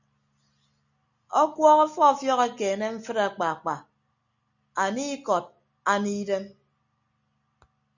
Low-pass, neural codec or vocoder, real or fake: 7.2 kHz; none; real